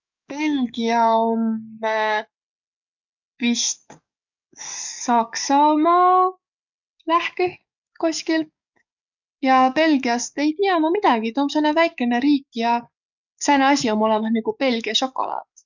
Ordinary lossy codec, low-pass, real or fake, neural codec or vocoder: none; 7.2 kHz; fake; codec, 44.1 kHz, 7.8 kbps, DAC